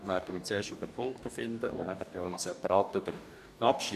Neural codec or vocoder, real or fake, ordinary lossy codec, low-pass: codec, 44.1 kHz, 2.6 kbps, DAC; fake; none; 14.4 kHz